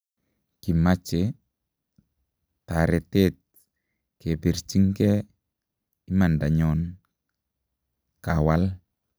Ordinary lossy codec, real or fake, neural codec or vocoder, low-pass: none; real; none; none